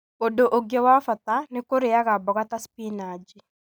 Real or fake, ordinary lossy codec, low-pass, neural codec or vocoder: real; none; none; none